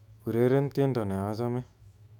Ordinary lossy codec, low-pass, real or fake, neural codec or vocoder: none; 19.8 kHz; fake; autoencoder, 48 kHz, 128 numbers a frame, DAC-VAE, trained on Japanese speech